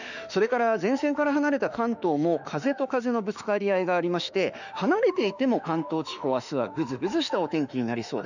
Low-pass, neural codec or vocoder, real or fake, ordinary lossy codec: 7.2 kHz; autoencoder, 48 kHz, 32 numbers a frame, DAC-VAE, trained on Japanese speech; fake; none